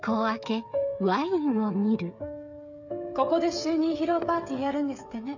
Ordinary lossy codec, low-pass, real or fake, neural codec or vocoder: none; 7.2 kHz; fake; codec, 16 kHz, 16 kbps, FreqCodec, smaller model